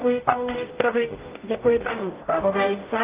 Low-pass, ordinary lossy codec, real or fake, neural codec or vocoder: 3.6 kHz; Opus, 32 kbps; fake; codec, 44.1 kHz, 0.9 kbps, DAC